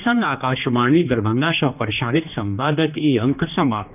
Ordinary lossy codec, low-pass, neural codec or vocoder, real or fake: none; 3.6 kHz; codec, 16 kHz, 2 kbps, X-Codec, HuBERT features, trained on general audio; fake